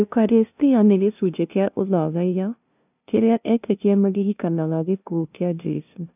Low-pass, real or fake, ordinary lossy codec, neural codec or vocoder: 3.6 kHz; fake; none; codec, 16 kHz, 0.5 kbps, FunCodec, trained on LibriTTS, 25 frames a second